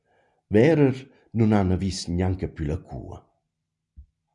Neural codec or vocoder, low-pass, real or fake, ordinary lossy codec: none; 10.8 kHz; real; MP3, 96 kbps